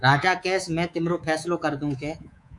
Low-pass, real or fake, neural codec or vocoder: 10.8 kHz; fake; codec, 24 kHz, 3.1 kbps, DualCodec